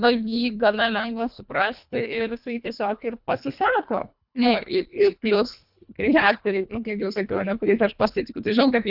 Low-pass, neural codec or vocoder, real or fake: 5.4 kHz; codec, 24 kHz, 1.5 kbps, HILCodec; fake